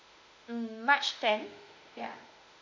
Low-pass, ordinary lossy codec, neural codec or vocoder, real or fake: 7.2 kHz; MP3, 64 kbps; autoencoder, 48 kHz, 32 numbers a frame, DAC-VAE, trained on Japanese speech; fake